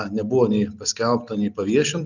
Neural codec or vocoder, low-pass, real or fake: none; 7.2 kHz; real